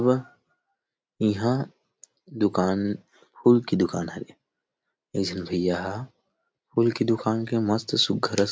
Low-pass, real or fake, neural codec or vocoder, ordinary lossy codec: none; real; none; none